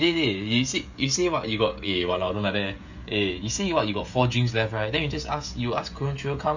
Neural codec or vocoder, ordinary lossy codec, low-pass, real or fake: codec, 16 kHz, 16 kbps, FreqCodec, smaller model; none; 7.2 kHz; fake